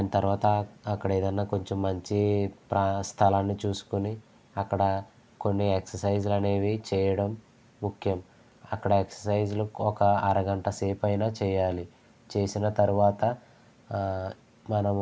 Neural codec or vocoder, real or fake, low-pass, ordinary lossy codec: none; real; none; none